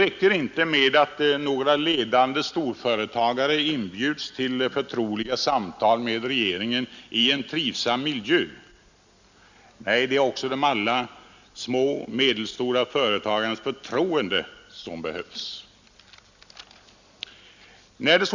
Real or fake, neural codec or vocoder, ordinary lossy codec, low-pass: real; none; Opus, 64 kbps; 7.2 kHz